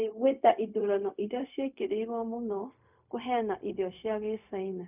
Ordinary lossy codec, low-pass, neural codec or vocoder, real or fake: none; 3.6 kHz; codec, 16 kHz, 0.4 kbps, LongCat-Audio-Codec; fake